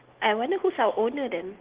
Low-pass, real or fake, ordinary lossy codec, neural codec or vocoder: 3.6 kHz; real; Opus, 16 kbps; none